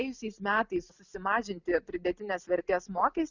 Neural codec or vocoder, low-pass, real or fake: none; 7.2 kHz; real